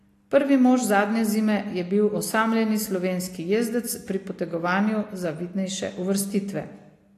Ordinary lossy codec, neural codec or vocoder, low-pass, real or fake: AAC, 48 kbps; none; 14.4 kHz; real